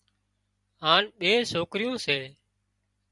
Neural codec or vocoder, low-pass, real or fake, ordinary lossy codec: vocoder, 44.1 kHz, 128 mel bands every 256 samples, BigVGAN v2; 10.8 kHz; fake; Opus, 64 kbps